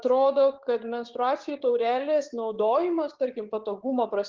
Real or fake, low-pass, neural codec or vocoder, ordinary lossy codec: fake; 7.2 kHz; codec, 16 kHz, 6 kbps, DAC; Opus, 16 kbps